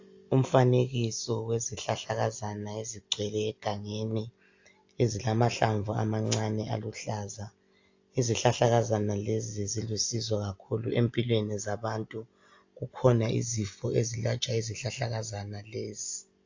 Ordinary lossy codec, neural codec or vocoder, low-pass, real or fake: AAC, 48 kbps; none; 7.2 kHz; real